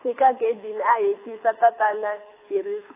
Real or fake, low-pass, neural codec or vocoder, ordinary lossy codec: fake; 3.6 kHz; codec, 24 kHz, 6 kbps, HILCodec; AAC, 24 kbps